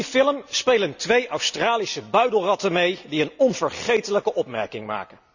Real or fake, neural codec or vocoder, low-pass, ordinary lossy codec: real; none; 7.2 kHz; none